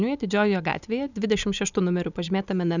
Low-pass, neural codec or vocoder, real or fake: 7.2 kHz; none; real